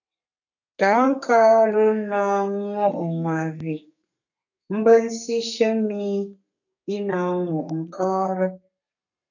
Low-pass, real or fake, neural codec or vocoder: 7.2 kHz; fake; codec, 32 kHz, 1.9 kbps, SNAC